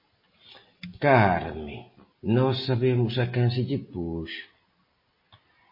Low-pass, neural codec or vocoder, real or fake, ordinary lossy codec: 5.4 kHz; none; real; MP3, 24 kbps